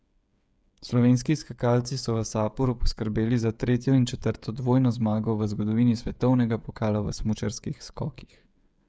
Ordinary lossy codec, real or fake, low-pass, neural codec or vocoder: none; fake; none; codec, 16 kHz, 16 kbps, FreqCodec, smaller model